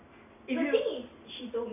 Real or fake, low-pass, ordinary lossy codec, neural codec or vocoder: real; 3.6 kHz; none; none